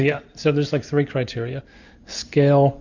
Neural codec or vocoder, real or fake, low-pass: none; real; 7.2 kHz